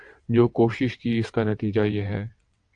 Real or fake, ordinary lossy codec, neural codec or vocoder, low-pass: fake; AAC, 64 kbps; vocoder, 22.05 kHz, 80 mel bands, WaveNeXt; 9.9 kHz